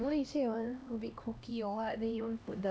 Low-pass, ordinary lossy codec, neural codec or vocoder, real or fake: none; none; codec, 16 kHz, 1 kbps, X-Codec, HuBERT features, trained on LibriSpeech; fake